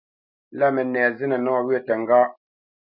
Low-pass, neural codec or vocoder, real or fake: 5.4 kHz; none; real